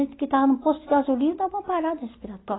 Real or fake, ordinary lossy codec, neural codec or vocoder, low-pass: real; AAC, 16 kbps; none; 7.2 kHz